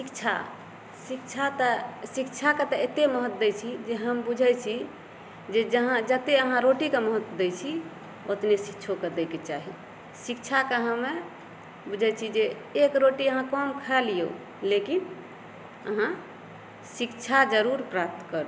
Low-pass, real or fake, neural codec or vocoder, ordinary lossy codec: none; real; none; none